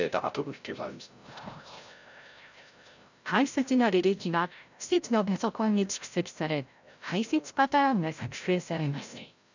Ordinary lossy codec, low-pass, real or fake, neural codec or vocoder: none; 7.2 kHz; fake; codec, 16 kHz, 0.5 kbps, FreqCodec, larger model